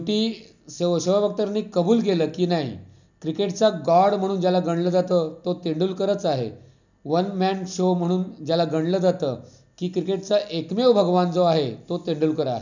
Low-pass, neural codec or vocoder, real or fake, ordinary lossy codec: 7.2 kHz; none; real; none